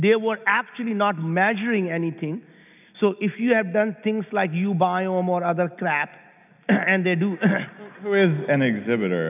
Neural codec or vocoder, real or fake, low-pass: none; real; 3.6 kHz